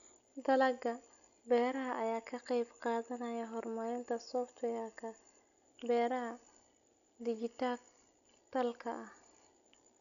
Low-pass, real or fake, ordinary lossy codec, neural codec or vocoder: 7.2 kHz; real; MP3, 96 kbps; none